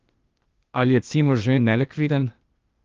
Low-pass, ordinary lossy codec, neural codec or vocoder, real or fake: 7.2 kHz; Opus, 32 kbps; codec, 16 kHz, 0.8 kbps, ZipCodec; fake